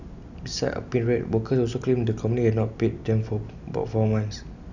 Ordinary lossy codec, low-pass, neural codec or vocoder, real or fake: none; 7.2 kHz; none; real